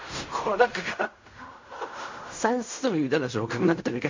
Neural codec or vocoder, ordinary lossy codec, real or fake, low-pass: codec, 16 kHz in and 24 kHz out, 0.4 kbps, LongCat-Audio-Codec, fine tuned four codebook decoder; MP3, 48 kbps; fake; 7.2 kHz